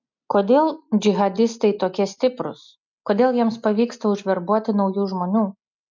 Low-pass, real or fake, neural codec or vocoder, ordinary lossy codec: 7.2 kHz; real; none; MP3, 64 kbps